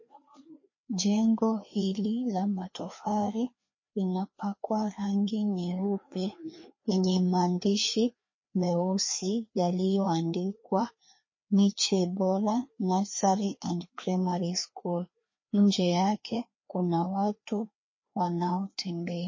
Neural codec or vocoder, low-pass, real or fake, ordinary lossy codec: codec, 16 kHz, 2 kbps, FreqCodec, larger model; 7.2 kHz; fake; MP3, 32 kbps